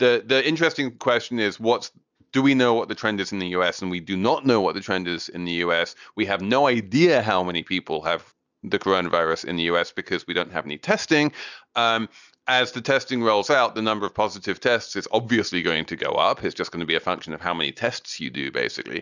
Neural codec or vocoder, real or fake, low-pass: none; real; 7.2 kHz